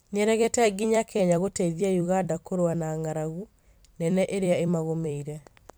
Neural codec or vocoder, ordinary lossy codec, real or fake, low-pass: vocoder, 44.1 kHz, 128 mel bands every 256 samples, BigVGAN v2; none; fake; none